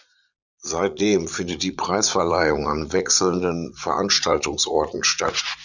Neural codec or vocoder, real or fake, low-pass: vocoder, 44.1 kHz, 80 mel bands, Vocos; fake; 7.2 kHz